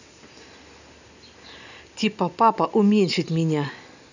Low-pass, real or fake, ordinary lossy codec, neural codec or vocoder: 7.2 kHz; real; none; none